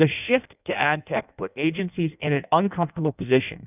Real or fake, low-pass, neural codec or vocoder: fake; 3.6 kHz; codec, 16 kHz in and 24 kHz out, 0.6 kbps, FireRedTTS-2 codec